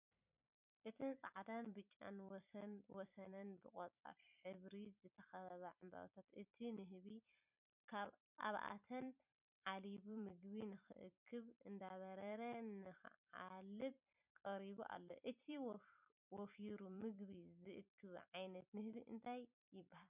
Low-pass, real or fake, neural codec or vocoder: 3.6 kHz; fake; vocoder, 44.1 kHz, 128 mel bands every 256 samples, BigVGAN v2